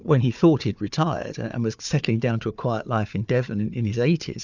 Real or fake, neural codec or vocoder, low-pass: fake; codec, 16 kHz, 4 kbps, FunCodec, trained on Chinese and English, 50 frames a second; 7.2 kHz